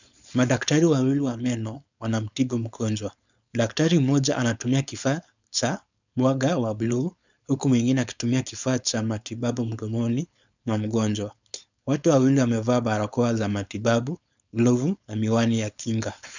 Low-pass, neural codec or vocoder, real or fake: 7.2 kHz; codec, 16 kHz, 4.8 kbps, FACodec; fake